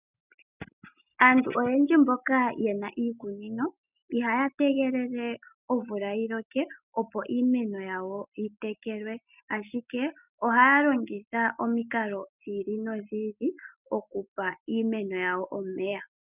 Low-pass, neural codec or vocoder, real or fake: 3.6 kHz; none; real